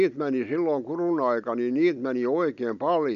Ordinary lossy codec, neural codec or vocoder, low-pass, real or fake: none; none; 7.2 kHz; real